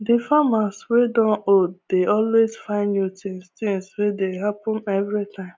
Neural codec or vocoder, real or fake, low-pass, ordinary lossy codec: none; real; none; none